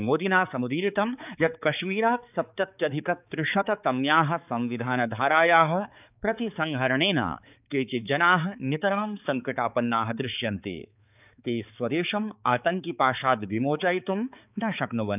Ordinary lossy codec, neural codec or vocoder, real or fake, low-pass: none; codec, 16 kHz, 4 kbps, X-Codec, HuBERT features, trained on balanced general audio; fake; 3.6 kHz